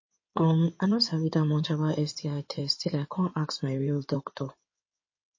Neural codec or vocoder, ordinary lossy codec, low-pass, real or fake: codec, 16 kHz in and 24 kHz out, 2.2 kbps, FireRedTTS-2 codec; MP3, 32 kbps; 7.2 kHz; fake